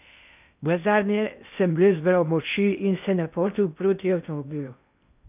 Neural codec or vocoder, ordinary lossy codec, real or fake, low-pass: codec, 16 kHz in and 24 kHz out, 0.6 kbps, FocalCodec, streaming, 2048 codes; none; fake; 3.6 kHz